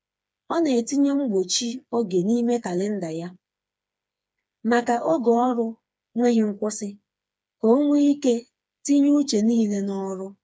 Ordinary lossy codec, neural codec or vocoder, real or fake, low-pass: none; codec, 16 kHz, 4 kbps, FreqCodec, smaller model; fake; none